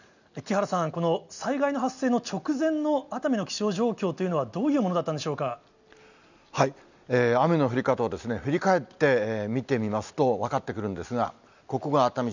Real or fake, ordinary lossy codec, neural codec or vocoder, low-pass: real; none; none; 7.2 kHz